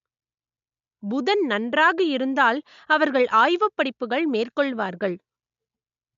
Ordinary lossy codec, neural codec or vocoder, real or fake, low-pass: MP3, 64 kbps; none; real; 7.2 kHz